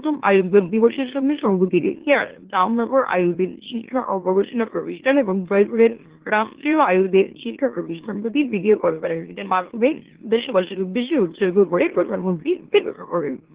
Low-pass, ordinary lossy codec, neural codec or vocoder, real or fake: 3.6 kHz; Opus, 16 kbps; autoencoder, 44.1 kHz, a latent of 192 numbers a frame, MeloTTS; fake